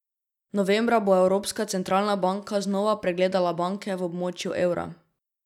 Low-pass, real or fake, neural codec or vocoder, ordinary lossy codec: 19.8 kHz; real; none; none